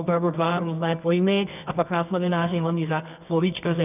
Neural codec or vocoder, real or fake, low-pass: codec, 24 kHz, 0.9 kbps, WavTokenizer, medium music audio release; fake; 3.6 kHz